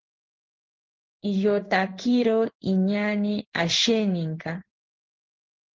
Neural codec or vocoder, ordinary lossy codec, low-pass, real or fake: codec, 16 kHz in and 24 kHz out, 1 kbps, XY-Tokenizer; Opus, 16 kbps; 7.2 kHz; fake